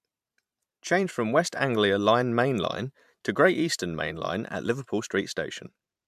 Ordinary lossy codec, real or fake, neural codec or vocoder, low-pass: MP3, 96 kbps; fake; vocoder, 44.1 kHz, 128 mel bands every 512 samples, BigVGAN v2; 14.4 kHz